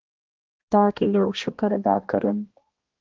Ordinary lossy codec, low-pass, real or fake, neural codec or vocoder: Opus, 16 kbps; 7.2 kHz; fake; codec, 16 kHz, 1 kbps, X-Codec, HuBERT features, trained on balanced general audio